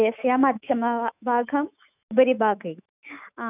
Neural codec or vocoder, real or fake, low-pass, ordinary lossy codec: autoencoder, 48 kHz, 128 numbers a frame, DAC-VAE, trained on Japanese speech; fake; 3.6 kHz; none